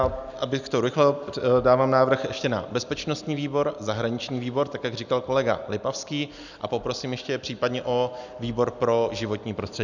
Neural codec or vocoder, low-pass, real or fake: none; 7.2 kHz; real